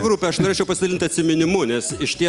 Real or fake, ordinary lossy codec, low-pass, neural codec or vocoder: real; Opus, 32 kbps; 10.8 kHz; none